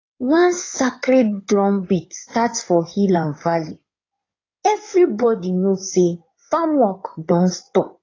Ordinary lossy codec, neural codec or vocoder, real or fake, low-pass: AAC, 32 kbps; codec, 16 kHz in and 24 kHz out, 2.2 kbps, FireRedTTS-2 codec; fake; 7.2 kHz